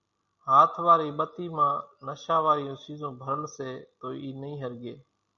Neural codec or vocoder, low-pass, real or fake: none; 7.2 kHz; real